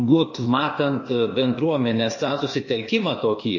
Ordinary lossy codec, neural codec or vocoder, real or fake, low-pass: MP3, 32 kbps; codec, 16 kHz, 0.8 kbps, ZipCodec; fake; 7.2 kHz